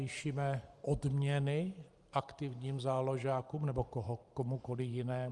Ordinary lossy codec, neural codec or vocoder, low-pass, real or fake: Opus, 32 kbps; vocoder, 44.1 kHz, 128 mel bands every 512 samples, BigVGAN v2; 10.8 kHz; fake